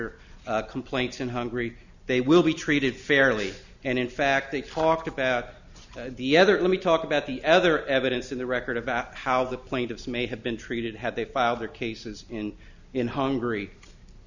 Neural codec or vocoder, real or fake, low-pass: none; real; 7.2 kHz